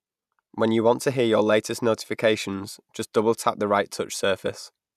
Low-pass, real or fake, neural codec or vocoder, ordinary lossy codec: 14.4 kHz; real; none; none